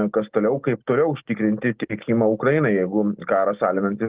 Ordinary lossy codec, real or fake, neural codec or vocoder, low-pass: Opus, 16 kbps; real; none; 3.6 kHz